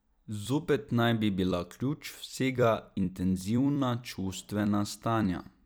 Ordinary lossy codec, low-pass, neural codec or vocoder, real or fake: none; none; vocoder, 44.1 kHz, 128 mel bands every 256 samples, BigVGAN v2; fake